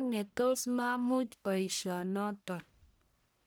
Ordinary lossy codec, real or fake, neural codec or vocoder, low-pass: none; fake; codec, 44.1 kHz, 1.7 kbps, Pupu-Codec; none